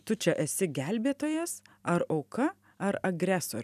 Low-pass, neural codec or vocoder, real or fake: 14.4 kHz; none; real